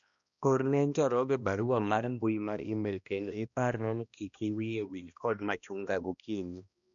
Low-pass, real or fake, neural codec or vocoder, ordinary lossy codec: 7.2 kHz; fake; codec, 16 kHz, 1 kbps, X-Codec, HuBERT features, trained on balanced general audio; none